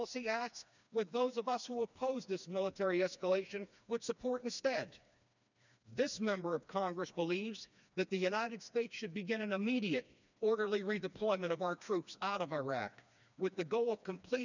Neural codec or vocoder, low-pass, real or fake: codec, 16 kHz, 2 kbps, FreqCodec, smaller model; 7.2 kHz; fake